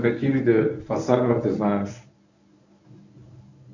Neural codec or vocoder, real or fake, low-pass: codec, 24 kHz, 0.9 kbps, WavTokenizer, medium speech release version 1; fake; 7.2 kHz